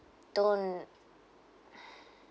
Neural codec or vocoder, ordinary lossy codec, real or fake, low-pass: none; none; real; none